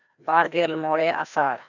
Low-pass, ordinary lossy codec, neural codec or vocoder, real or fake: 7.2 kHz; none; codec, 16 kHz, 1 kbps, FreqCodec, larger model; fake